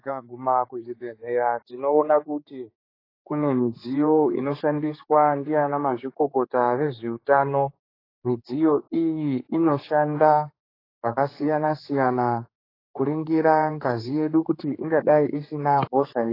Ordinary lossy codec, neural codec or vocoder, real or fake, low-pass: AAC, 24 kbps; codec, 16 kHz, 4 kbps, X-Codec, HuBERT features, trained on general audio; fake; 5.4 kHz